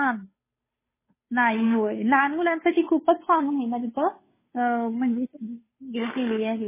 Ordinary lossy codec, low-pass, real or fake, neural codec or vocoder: MP3, 16 kbps; 3.6 kHz; fake; codec, 16 kHz, 2 kbps, FunCodec, trained on Chinese and English, 25 frames a second